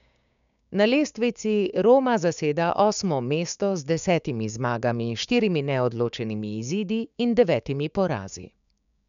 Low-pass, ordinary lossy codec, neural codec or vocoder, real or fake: 7.2 kHz; none; codec, 16 kHz, 6 kbps, DAC; fake